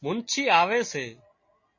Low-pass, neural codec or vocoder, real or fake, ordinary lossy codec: 7.2 kHz; none; real; MP3, 32 kbps